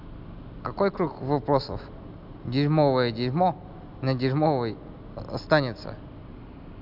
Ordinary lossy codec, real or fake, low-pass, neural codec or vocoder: none; real; 5.4 kHz; none